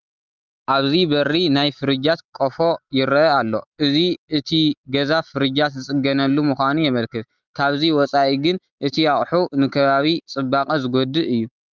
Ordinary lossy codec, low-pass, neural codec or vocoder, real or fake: Opus, 24 kbps; 7.2 kHz; none; real